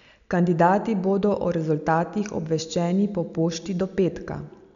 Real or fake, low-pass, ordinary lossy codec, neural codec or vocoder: real; 7.2 kHz; none; none